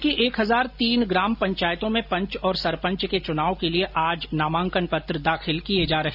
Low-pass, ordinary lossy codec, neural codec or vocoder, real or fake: 5.4 kHz; none; none; real